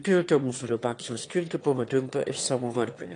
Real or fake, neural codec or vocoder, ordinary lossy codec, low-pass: fake; autoencoder, 22.05 kHz, a latent of 192 numbers a frame, VITS, trained on one speaker; AAC, 48 kbps; 9.9 kHz